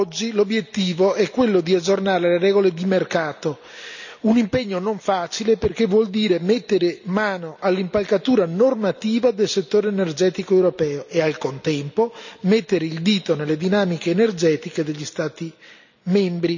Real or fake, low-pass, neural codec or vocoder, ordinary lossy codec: real; 7.2 kHz; none; none